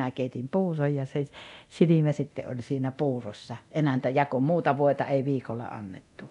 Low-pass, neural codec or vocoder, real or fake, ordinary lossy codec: 10.8 kHz; codec, 24 kHz, 0.9 kbps, DualCodec; fake; none